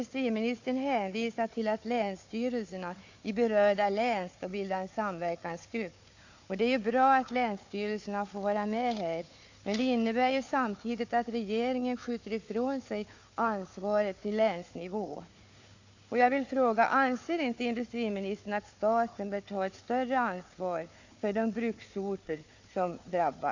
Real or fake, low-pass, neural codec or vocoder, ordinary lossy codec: fake; 7.2 kHz; codec, 16 kHz, 4 kbps, FunCodec, trained on Chinese and English, 50 frames a second; AAC, 48 kbps